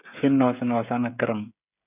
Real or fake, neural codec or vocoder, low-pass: fake; codec, 16 kHz, 16 kbps, FreqCodec, smaller model; 3.6 kHz